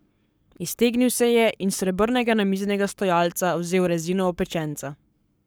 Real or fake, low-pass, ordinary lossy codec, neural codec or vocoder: fake; none; none; codec, 44.1 kHz, 7.8 kbps, Pupu-Codec